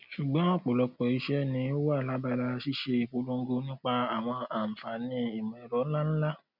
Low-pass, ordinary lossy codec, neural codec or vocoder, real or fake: 5.4 kHz; none; none; real